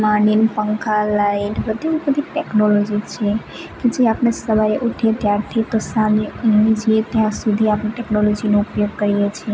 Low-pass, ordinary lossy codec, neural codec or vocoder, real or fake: none; none; none; real